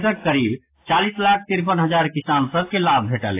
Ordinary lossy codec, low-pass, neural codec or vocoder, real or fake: AAC, 24 kbps; 3.6 kHz; none; real